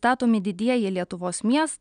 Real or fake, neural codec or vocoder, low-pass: real; none; 9.9 kHz